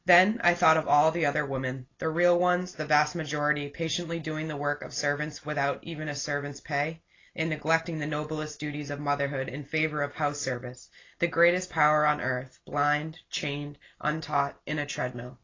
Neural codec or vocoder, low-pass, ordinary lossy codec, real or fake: none; 7.2 kHz; AAC, 32 kbps; real